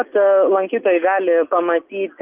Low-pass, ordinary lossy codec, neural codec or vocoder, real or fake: 3.6 kHz; Opus, 32 kbps; none; real